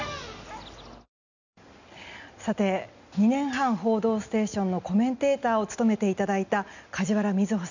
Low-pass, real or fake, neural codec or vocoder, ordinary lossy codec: 7.2 kHz; real; none; none